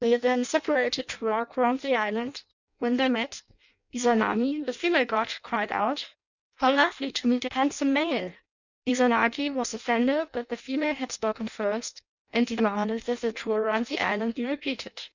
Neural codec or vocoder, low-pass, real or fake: codec, 16 kHz in and 24 kHz out, 0.6 kbps, FireRedTTS-2 codec; 7.2 kHz; fake